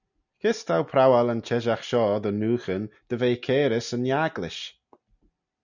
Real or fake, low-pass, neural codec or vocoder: real; 7.2 kHz; none